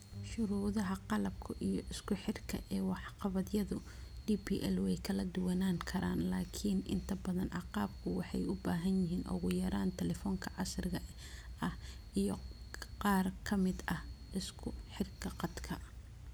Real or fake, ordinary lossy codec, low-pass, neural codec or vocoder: real; none; none; none